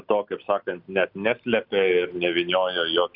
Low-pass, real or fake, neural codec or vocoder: 5.4 kHz; real; none